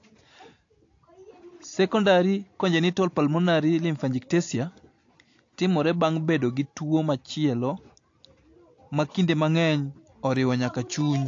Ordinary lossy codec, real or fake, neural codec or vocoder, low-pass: AAC, 48 kbps; real; none; 7.2 kHz